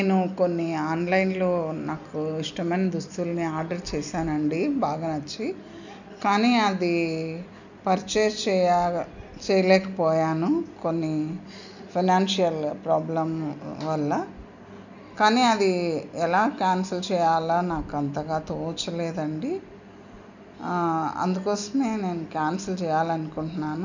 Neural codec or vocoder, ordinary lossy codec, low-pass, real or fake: none; none; 7.2 kHz; real